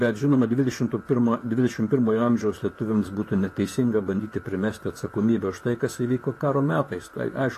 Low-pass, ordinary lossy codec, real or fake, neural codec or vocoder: 14.4 kHz; AAC, 48 kbps; fake; vocoder, 44.1 kHz, 128 mel bands, Pupu-Vocoder